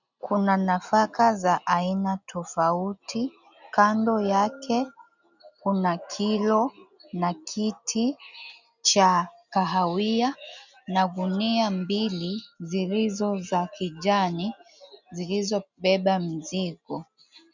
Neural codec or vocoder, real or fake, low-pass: none; real; 7.2 kHz